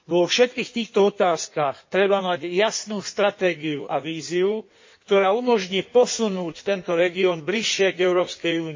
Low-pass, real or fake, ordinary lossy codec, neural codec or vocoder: 7.2 kHz; fake; MP3, 32 kbps; codec, 16 kHz in and 24 kHz out, 1.1 kbps, FireRedTTS-2 codec